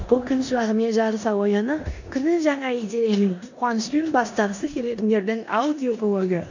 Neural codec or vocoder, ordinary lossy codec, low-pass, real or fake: codec, 16 kHz in and 24 kHz out, 0.9 kbps, LongCat-Audio-Codec, four codebook decoder; none; 7.2 kHz; fake